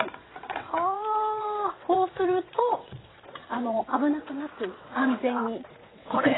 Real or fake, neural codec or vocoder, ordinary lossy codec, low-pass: fake; vocoder, 22.05 kHz, 80 mel bands, WaveNeXt; AAC, 16 kbps; 7.2 kHz